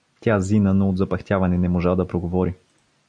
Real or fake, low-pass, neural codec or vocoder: real; 9.9 kHz; none